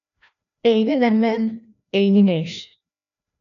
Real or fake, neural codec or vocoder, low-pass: fake; codec, 16 kHz, 1 kbps, FreqCodec, larger model; 7.2 kHz